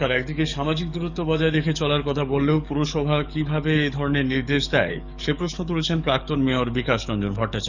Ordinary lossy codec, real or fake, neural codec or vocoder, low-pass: none; fake; codec, 44.1 kHz, 7.8 kbps, DAC; 7.2 kHz